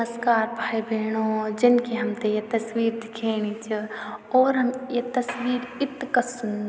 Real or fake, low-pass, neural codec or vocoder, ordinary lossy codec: real; none; none; none